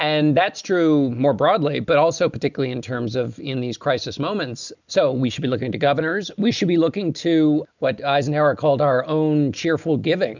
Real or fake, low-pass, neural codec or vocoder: real; 7.2 kHz; none